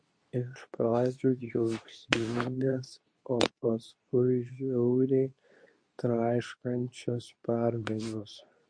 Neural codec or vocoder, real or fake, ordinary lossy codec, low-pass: codec, 24 kHz, 0.9 kbps, WavTokenizer, medium speech release version 2; fake; MP3, 48 kbps; 9.9 kHz